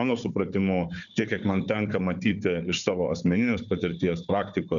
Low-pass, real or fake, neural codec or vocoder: 7.2 kHz; fake; codec, 16 kHz, 4 kbps, FunCodec, trained on Chinese and English, 50 frames a second